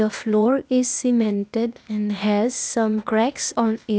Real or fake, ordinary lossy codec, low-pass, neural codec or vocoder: fake; none; none; codec, 16 kHz, 0.8 kbps, ZipCodec